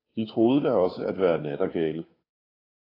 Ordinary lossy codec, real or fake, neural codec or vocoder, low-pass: AAC, 24 kbps; fake; codec, 16 kHz, 2 kbps, FunCodec, trained on Chinese and English, 25 frames a second; 5.4 kHz